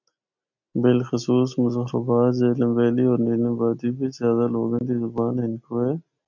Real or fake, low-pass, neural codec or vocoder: real; 7.2 kHz; none